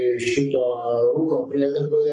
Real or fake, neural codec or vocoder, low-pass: fake; codec, 44.1 kHz, 3.4 kbps, Pupu-Codec; 10.8 kHz